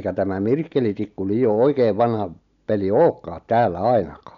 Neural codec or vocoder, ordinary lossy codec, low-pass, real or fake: none; none; 7.2 kHz; real